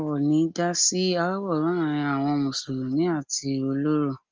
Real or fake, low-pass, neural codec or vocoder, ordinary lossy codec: real; 7.2 kHz; none; Opus, 24 kbps